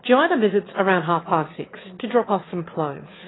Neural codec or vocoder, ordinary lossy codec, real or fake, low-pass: autoencoder, 22.05 kHz, a latent of 192 numbers a frame, VITS, trained on one speaker; AAC, 16 kbps; fake; 7.2 kHz